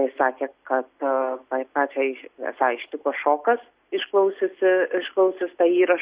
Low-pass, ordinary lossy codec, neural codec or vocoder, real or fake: 3.6 kHz; Opus, 64 kbps; none; real